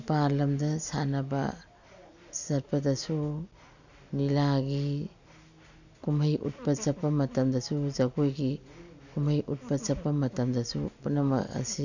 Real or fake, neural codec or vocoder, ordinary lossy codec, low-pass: real; none; none; 7.2 kHz